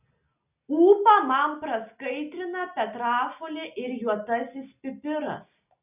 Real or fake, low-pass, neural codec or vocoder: real; 3.6 kHz; none